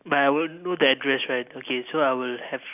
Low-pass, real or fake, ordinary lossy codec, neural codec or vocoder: 3.6 kHz; real; none; none